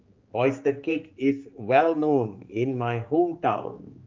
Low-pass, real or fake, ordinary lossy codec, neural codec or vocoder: 7.2 kHz; fake; Opus, 24 kbps; codec, 16 kHz, 4 kbps, X-Codec, HuBERT features, trained on general audio